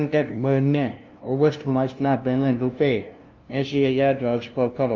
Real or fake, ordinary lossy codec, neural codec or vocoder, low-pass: fake; Opus, 32 kbps; codec, 16 kHz, 0.5 kbps, FunCodec, trained on LibriTTS, 25 frames a second; 7.2 kHz